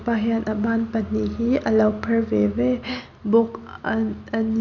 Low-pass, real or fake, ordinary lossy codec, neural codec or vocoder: 7.2 kHz; real; none; none